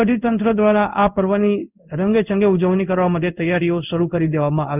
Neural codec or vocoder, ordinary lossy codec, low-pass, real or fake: codec, 16 kHz in and 24 kHz out, 1 kbps, XY-Tokenizer; none; 3.6 kHz; fake